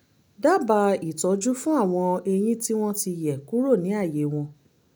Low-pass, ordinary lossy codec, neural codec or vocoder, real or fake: none; none; none; real